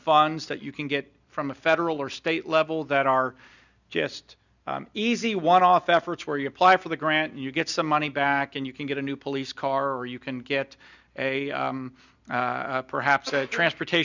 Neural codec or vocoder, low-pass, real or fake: none; 7.2 kHz; real